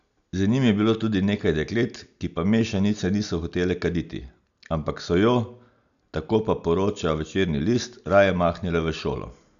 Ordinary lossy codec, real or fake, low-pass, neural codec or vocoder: none; real; 7.2 kHz; none